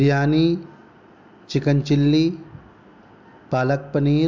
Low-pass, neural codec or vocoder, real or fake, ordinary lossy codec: 7.2 kHz; none; real; MP3, 64 kbps